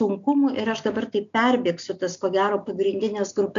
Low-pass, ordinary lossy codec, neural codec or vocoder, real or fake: 7.2 kHz; AAC, 64 kbps; none; real